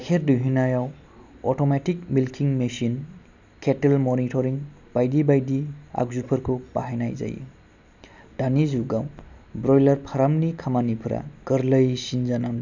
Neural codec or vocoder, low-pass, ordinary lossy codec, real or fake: none; 7.2 kHz; none; real